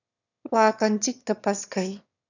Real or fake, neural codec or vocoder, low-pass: fake; autoencoder, 22.05 kHz, a latent of 192 numbers a frame, VITS, trained on one speaker; 7.2 kHz